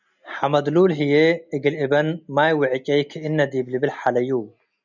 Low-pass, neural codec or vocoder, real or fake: 7.2 kHz; none; real